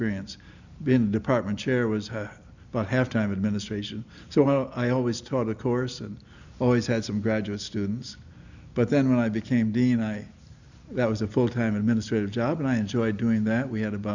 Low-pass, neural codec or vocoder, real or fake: 7.2 kHz; none; real